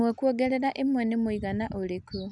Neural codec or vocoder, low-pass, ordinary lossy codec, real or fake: none; 10.8 kHz; none; real